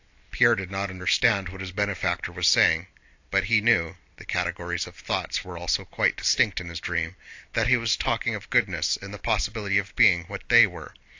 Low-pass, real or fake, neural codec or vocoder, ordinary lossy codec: 7.2 kHz; real; none; AAC, 48 kbps